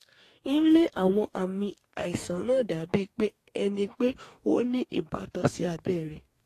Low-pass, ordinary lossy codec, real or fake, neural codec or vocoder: 14.4 kHz; AAC, 48 kbps; fake; codec, 44.1 kHz, 2.6 kbps, DAC